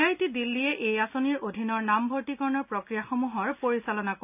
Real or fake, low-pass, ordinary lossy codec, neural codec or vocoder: real; 3.6 kHz; none; none